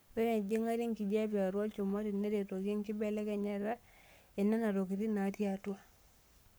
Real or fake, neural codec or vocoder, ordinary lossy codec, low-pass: fake; codec, 44.1 kHz, 7.8 kbps, Pupu-Codec; none; none